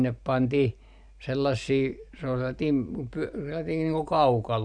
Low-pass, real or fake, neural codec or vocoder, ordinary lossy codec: 9.9 kHz; real; none; none